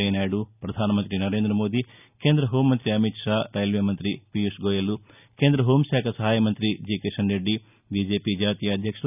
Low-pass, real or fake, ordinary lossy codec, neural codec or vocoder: 3.6 kHz; real; none; none